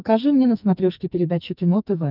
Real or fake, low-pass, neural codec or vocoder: fake; 5.4 kHz; codec, 44.1 kHz, 2.6 kbps, SNAC